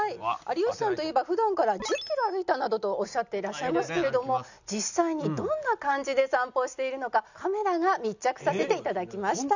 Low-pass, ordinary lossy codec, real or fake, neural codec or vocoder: 7.2 kHz; none; real; none